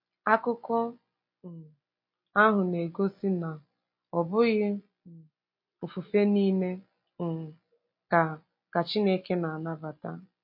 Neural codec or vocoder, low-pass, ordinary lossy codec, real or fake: none; 5.4 kHz; MP3, 32 kbps; real